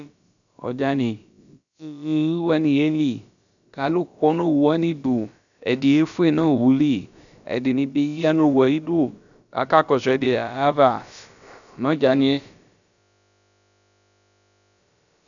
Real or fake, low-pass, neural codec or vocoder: fake; 7.2 kHz; codec, 16 kHz, about 1 kbps, DyCAST, with the encoder's durations